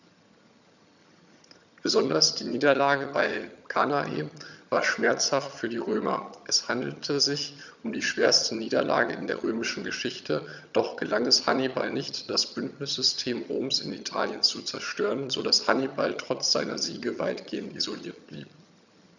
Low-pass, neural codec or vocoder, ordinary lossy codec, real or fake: 7.2 kHz; vocoder, 22.05 kHz, 80 mel bands, HiFi-GAN; none; fake